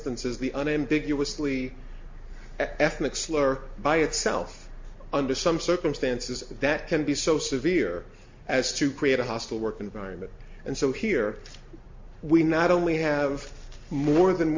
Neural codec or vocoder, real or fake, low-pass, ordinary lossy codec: none; real; 7.2 kHz; MP3, 48 kbps